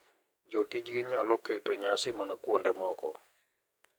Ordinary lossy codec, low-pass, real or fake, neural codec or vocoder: none; none; fake; codec, 44.1 kHz, 2.6 kbps, SNAC